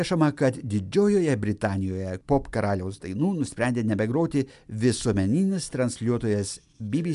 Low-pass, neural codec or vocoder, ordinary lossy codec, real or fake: 10.8 kHz; none; MP3, 96 kbps; real